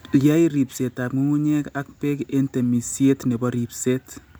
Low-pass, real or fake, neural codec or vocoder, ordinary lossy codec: none; real; none; none